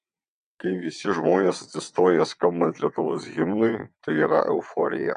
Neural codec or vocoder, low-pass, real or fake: vocoder, 22.05 kHz, 80 mel bands, WaveNeXt; 9.9 kHz; fake